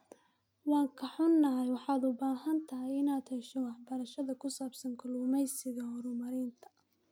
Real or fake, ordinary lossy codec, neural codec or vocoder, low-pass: real; none; none; 19.8 kHz